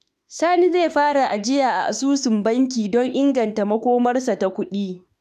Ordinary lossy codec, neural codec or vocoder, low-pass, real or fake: none; autoencoder, 48 kHz, 32 numbers a frame, DAC-VAE, trained on Japanese speech; 14.4 kHz; fake